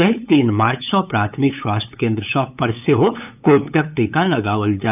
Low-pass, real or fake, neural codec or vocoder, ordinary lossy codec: 3.6 kHz; fake; codec, 16 kHz, 8 kbps, FunCodec, trained on LibriTTS, 25 frames a second; none